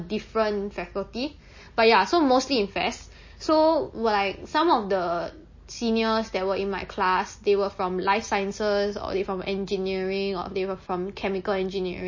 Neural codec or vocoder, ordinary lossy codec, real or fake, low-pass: none; MP3, 32 kbps; real; 7.2 kHz